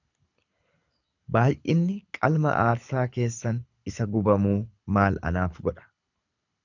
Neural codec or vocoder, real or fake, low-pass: codec, 24 kHz, 6 kbps, HILCodec; fake; 7.2 kHz